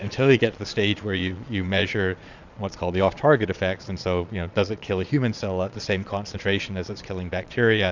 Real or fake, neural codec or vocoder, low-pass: fake; vocoder, 22.05 kHz, 80 mel bands, Vocos; 7.2 kHz